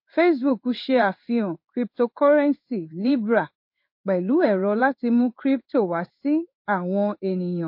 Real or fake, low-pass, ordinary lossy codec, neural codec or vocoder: fake; 5.4 kHz; MP3, 32 kbps; codec, 16 kHz in and 24 kHz out, 1 kbps, XY-Tokenizer